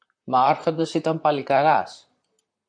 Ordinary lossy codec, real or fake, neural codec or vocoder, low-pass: MP3, 96 kbps; fake; vocoder, 44.1 kHz, 128 mel bands, Pupu-Vocoder; 9.9 kHz